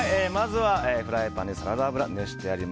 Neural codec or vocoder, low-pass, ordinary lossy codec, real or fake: none; none; none; real